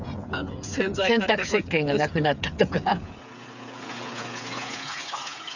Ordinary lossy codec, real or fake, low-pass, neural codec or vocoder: none; fake; 7.2 kHz; codec, 16 kHz, 8 kbps, FreqCodec, smaller model